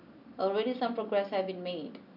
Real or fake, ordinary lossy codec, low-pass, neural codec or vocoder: real; none; 5.4 kHz; none